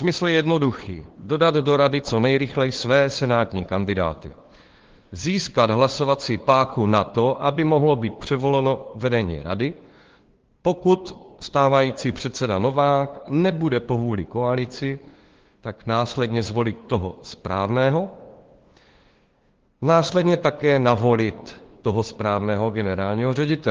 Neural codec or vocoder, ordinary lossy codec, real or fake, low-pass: codec, 16 kHz, 2 kbps, FunCodec, trained on LibriTTS, 25 frames a second; Opus, 16 kbps; fake; 7.2 kHz